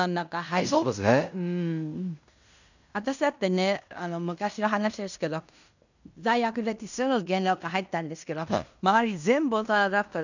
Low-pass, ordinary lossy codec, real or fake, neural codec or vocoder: 7.2 kHz; none; fake; codec, 16 kHz in and 24 kHz out, 0.9 kbps, LongCat-Audio-Codec, fine tuned four codebook decoder